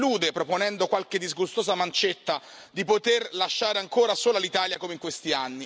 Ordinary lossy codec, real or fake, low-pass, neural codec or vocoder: none; real; none; none